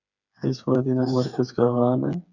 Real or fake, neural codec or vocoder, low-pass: fake; codec, 16 kHz, 4 kbps, FreqCodec, smaller model; 7.2 kHz